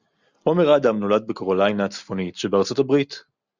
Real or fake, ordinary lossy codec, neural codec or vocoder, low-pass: real; Opus, 64 kbps; none; 7.2 kHz